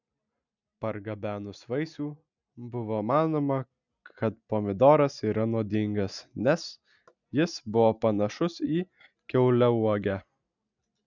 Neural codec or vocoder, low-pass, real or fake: none; 7.2 kHz; real